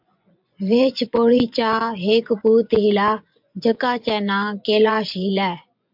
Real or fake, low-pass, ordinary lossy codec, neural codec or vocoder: fake; 5.4 kHz; MP3, 48 kbps; vocoder, 24 kHz, 100 mel bands, Vocos